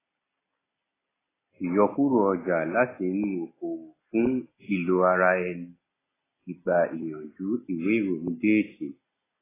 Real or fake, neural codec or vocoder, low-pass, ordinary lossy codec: real; none; 3.6 kHz; AAC, 16 kbps